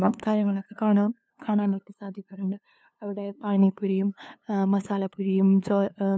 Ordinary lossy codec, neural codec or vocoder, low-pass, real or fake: none; codec, 16 kHz, 2 kbps, FunCodec, trained on LibriTTS, 25 frames a second; none; fake